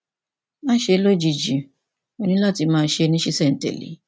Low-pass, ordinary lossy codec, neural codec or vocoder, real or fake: none; none; none; real